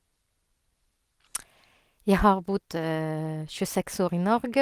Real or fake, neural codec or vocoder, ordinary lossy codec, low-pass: real; none; Opus, 24 kbps; 14.4 kHz